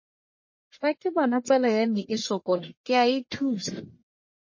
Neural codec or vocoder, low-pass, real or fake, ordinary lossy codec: codec, 44.1 kHz, 1.7 kbps, Pupu-Codec; 7.2 kHz; fake; MP3, 32 kbps